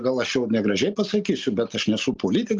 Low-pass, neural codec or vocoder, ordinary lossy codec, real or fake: 7.2 kHz; none; Opus, 32 kbps; real